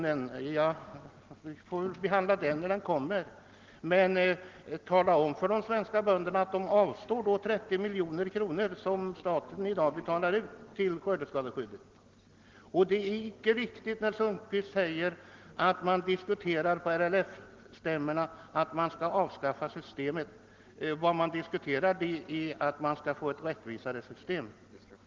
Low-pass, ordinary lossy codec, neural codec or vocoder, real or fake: 7.2 kHz; Opus, 16 kbps; none; real